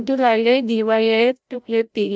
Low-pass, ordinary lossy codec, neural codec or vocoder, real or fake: none; none; codec, 16 kHz, 0.5 kbps, FreqCodec, larger model; fake